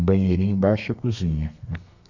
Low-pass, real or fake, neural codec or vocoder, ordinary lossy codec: 7.2 kHz; fake; codec, 32 kHz, 1.9 kbps, SNAC; none